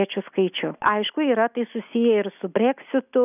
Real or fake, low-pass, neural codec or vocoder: real; 3.6 kHz; none